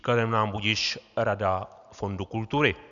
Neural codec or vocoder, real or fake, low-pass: none; real; 7.2 kHz